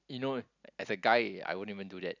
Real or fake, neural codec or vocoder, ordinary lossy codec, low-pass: real; none; none; 7.2 kHz